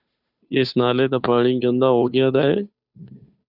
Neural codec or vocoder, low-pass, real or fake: codec, 16 kHz, 2 kbps, FunCodec, trained on Chinese and English, 25 frames a second; 5.4 kHz; fake